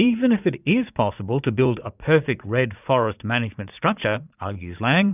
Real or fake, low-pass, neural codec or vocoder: fake; 3.6 kHz; vocoder, 44.1 kHz, 80 mel bands, Vocos